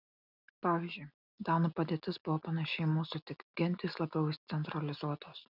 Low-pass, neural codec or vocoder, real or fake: 5.4 kHz; none; real